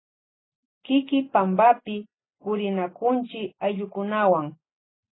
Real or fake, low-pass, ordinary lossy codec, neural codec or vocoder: real; 7.2 kHz; AAC, 16 kbps; none